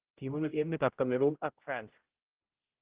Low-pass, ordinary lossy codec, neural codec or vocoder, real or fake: 3.6 kHz; Opus, 16 kbps; codec, 16 kHz, 0.5 kbps, X-Codec, HuBERT features, trained on general audio; fake